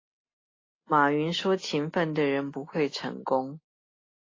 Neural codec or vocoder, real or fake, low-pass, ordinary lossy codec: none; real; 7.2 kHz; AAC, 32 kbps